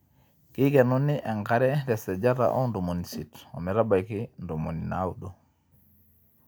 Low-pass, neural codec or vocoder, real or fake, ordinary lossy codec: none; none; real; none